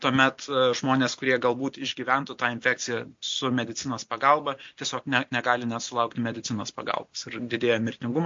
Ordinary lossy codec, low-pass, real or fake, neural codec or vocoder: MP3, 48 kbps; 7.2 kHz; real; none